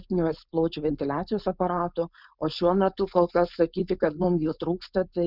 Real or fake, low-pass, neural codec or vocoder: fake; 5.4 kHz; codec, 16 kHz, 4.8 kbps, FACodec